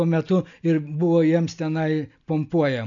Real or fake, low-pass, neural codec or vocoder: real; 7.2 kHz; none